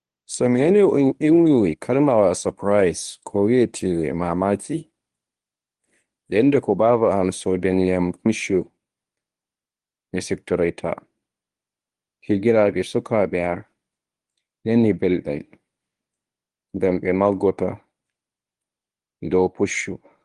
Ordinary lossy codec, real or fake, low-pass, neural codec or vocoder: Opus, 24 kbps; fake; 10.8 kHz; codec, 24 kHz, 0.9 kbps, WavTokenizer, medium speech release version 1